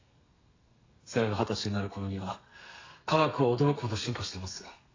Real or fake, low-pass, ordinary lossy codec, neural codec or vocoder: fake; 7.2 kHz; AAC, 32 kbps; codec, 32 kHz, 1.9 kbps, SNAC